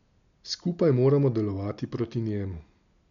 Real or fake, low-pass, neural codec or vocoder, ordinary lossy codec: real; 7.2 kHz; none; none